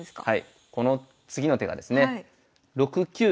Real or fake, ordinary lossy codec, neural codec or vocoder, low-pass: real; none; none; none